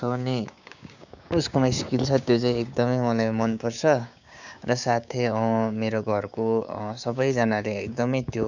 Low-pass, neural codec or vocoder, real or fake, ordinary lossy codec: 7.2 kHz; codec, 16 kHz, 6 kbps, DAC; fake; none